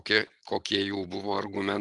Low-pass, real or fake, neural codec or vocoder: 10.8 kHz; real; none